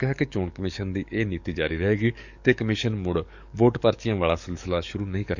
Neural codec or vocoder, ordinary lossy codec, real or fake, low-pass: codec, 44.1 kHz, 7.8 kbps, DAC; none; fake; 7.2 kHz